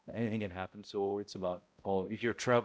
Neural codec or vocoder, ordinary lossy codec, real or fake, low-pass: codec, 16 kHz, 0.5 kbps, X-Codec, HuBERT features, trained on balanced general audio; none; fake; none